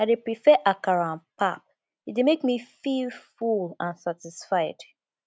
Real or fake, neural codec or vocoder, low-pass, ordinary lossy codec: real; none; none; none